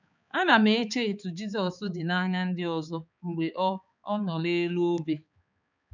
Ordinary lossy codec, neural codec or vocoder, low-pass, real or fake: none; codec, 16 kHz, 4 kbps, X-Codec, HuBERT features, trained on balanced general audio; 7.2 kHz; fake